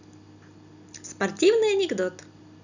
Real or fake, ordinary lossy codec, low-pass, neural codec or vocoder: real; none; 7.2 kHz; none